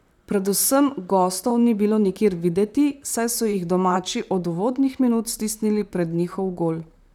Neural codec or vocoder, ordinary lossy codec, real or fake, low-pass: vocoder, 44.1 kHz, 128 mel bands, Pupu-Vocoder; none; fake; 19.8 kHz